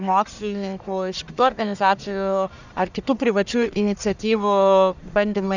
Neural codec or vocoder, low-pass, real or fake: codec, 44.1 kHz, 1.7 kbps, Pupu-Codec; 7.2 kHz; fake